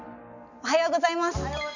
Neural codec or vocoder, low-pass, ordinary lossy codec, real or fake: none; 7.2 kHz; none; real